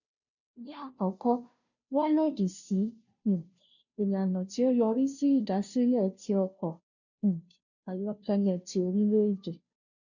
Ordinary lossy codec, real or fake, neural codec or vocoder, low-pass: none; fake; codec, 16 kHz, 0.5 kbps, FunCodec, trained on Chinese and English, 25 frames a second; 7.2 kHz